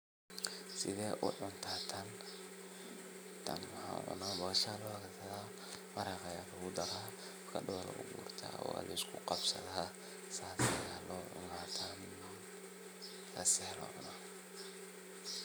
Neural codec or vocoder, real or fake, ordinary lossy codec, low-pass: none; real; none; none